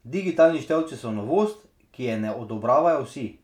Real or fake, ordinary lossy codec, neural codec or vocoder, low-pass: real; none; none; 19.8 kHz